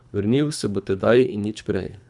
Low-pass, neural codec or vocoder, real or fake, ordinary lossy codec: 10.8 kHz; codec, 24 kHz, 3 kbps, HILCodec; fake; none